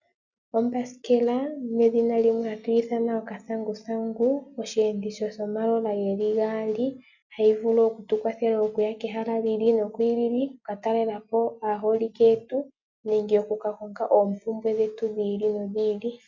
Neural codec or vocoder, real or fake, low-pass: none; real; 7.2 kHz